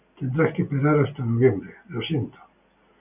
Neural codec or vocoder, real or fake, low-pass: none; real; 3.6 kHz